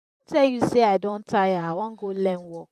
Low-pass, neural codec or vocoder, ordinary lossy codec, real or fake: 14.4 kHz; vocoder, 44.1 kHz, 128 mel bands, Pupu-Vocoder; none; fake